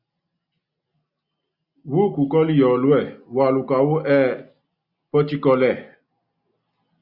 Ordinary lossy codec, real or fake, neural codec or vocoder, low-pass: Opus, 64 kbps; real; none; 5.4 kHz